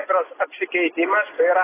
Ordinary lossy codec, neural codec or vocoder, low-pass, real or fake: AAC, 16 kbps; none; 3.6 kHz; real